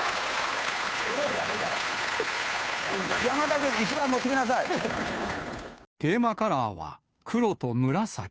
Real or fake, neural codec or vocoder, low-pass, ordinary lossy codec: fake; codec, 16 kHz, 2 kbps, FunCodec, trained on Chinese and English, 25 frames a second; none; none